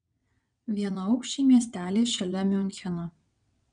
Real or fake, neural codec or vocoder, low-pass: fake; vocoder, 22.05 kHz, 80 mel bands, WaveNeXt; 9.9 kHz